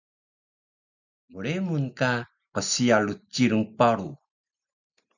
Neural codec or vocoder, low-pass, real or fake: none; 7.2 kHz; real